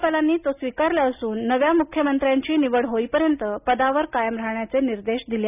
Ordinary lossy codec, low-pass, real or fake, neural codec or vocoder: none; 3.6 kHz; real; none